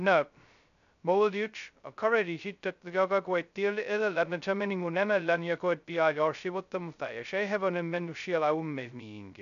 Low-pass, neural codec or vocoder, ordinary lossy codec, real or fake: 7.2 kHz; codec, 16 kHz, 0.2 kbps, FocalCodec; none; fake